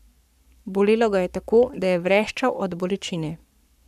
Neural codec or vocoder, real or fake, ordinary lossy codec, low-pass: codec, 44.1 kHz, 7.8 kbps, Pupu-Codec; fake; none; 14.4 kHz